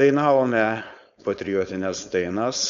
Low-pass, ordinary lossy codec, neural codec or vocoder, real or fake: 7.2 kHz; MP3, 64 kbps; codec, 16 kHz, 4.8 kbps, FACodec; fake